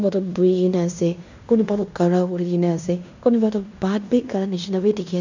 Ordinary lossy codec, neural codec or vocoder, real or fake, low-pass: none; codec, 16 kHz in and 24 kHz out, 0.9 kbps, LongCat-Audio-Codec, fine tuned four codebook decoder; fake; 7.2 kHz